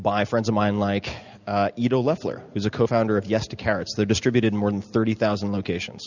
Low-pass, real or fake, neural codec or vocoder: 7.2 kHz; real; none